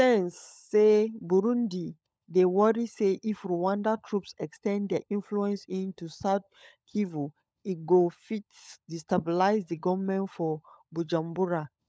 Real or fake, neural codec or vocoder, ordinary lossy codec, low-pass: fake; codec, 16 kHz, 16 kbps, FunCodec, trained on LibriTTS, 50 frames a second; none; none